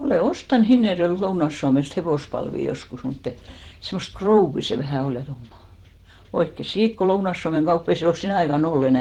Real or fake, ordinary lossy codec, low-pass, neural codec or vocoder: fake; Opus, 16 kbps; 19.8 kHz; vocoder, 44.1 kHz, 128 mel bands every 512 samples, BigVGAN v2